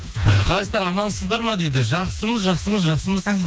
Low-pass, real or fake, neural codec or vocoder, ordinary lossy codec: none; fake; codec, 16 kHz, 2 kbps, FreqCodec, smaller model; none